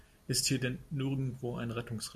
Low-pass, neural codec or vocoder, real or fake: 14.4 kHz; none; real